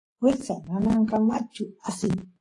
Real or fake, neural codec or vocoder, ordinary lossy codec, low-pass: fake; vocoder, 24 kHz, 100 mel bands, Vocos; AAC, 48 kbps; 10.8 kHz